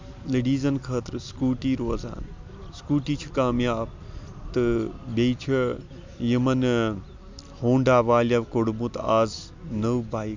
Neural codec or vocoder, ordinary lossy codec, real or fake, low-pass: none; MP3, 64 kbps; real; 7.2 kHz